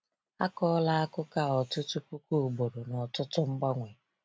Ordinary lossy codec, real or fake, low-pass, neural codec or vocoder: none; real; none; none